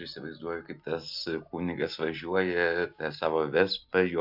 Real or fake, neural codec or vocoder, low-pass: real; none; 5.4 kHz